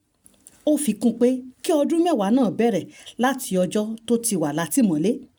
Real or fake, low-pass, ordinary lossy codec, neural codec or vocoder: real; none; none; none